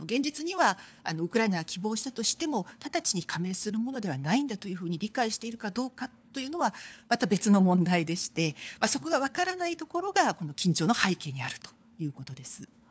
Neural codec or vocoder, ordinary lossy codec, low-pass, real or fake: codec, 16 kHz, 4 kbps, FunCodec, trained on LibriTTS, 50 frames a second; none; none; fake